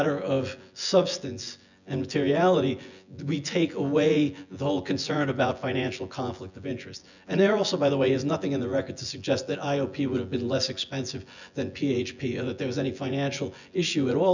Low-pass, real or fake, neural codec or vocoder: 7.2 kHz; fake; vocoder, 24 kHz, 100 mel bands, Vocos